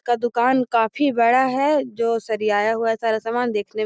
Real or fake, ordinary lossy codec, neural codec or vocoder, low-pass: real; none; none; none